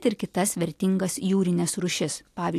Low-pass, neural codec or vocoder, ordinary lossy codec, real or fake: 14.4 kHz; none; AAC, 64 kbps; real